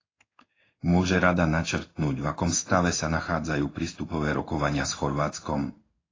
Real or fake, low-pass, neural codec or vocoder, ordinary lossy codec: fake; 7.2 kHz; codec, 16 kHz in and 24 kHz out, 1 kbps, XY-Tokenizer; AAC, 32 kbps